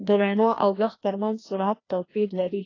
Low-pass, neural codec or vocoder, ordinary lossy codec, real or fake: 7.2 kHz; codec, 16 kHz, 1 kbps, FreqCodec, larger model; AAC, 32 kbps; fake